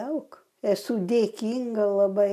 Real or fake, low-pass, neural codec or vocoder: real; 14.4 kHz; none